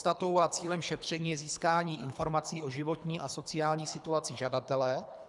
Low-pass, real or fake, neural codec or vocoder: 10.8 kHz; fake; codec, 24 kHz, 3 kbps, HILCodec